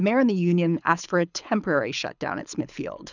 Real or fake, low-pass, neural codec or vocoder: fake; 7.2 kHz; codec, 24 kHz, 6 kbps, HILCodec